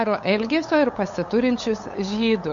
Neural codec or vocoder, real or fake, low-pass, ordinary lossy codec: codec, 16 kHz, 8 kbps, FunCodec, trained on LibriTTS, 25 frames a second; fake; 7.2 kHz; MP3, 48 kbps